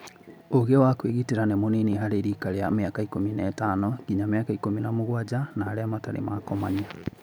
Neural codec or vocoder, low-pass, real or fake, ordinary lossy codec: none; none; real; none